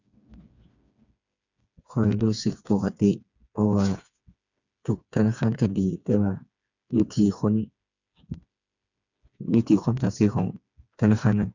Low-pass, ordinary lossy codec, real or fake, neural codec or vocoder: 7.2 kHz; none; fake; codec, 16 kHz, 4 kbps, FreqCodec, smaller model